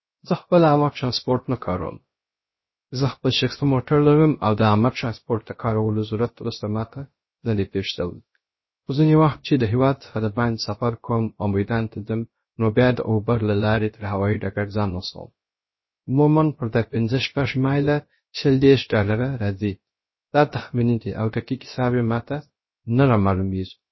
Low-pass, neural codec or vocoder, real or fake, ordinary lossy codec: 7.2 kHz; codec, 16 kHz, 0.3 kbps, FocalCodec; fake; MP3, 24 kbps